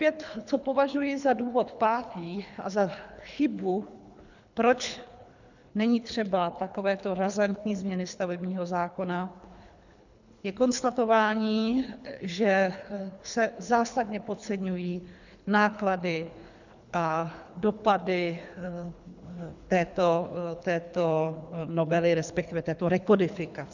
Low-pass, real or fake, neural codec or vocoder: 7.2 kHz; fake; codec, 24 kHz, 3 kbps, HILCodec